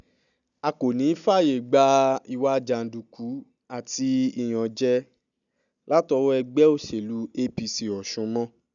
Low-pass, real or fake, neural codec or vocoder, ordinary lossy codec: 7.2 kHz; real; none; none